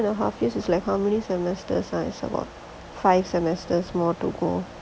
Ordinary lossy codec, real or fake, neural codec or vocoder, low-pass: none; real; none; none